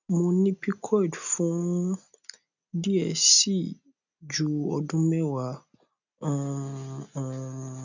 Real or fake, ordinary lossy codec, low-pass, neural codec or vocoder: real; none; 7.2 kHz; none